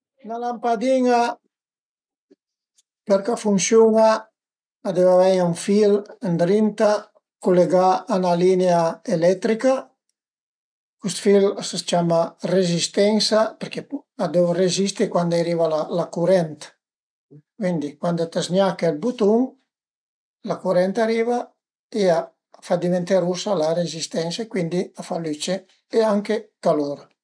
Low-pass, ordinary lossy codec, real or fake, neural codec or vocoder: 9.9 kHz; none; real; none